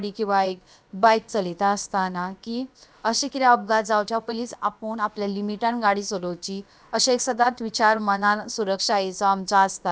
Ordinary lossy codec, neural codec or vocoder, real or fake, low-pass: none; codec, 16 kHz, about 1 kbps, DyCAST, with the encoder's durations; fake; none